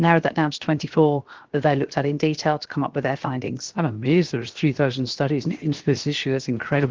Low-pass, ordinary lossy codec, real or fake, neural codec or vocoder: 7.2 kHz; Opus, 16 kbps; fake; codec, 16 kHz, 0.7 kbps, FocalCodec